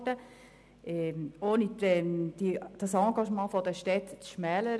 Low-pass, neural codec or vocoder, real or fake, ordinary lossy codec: none; none; real; none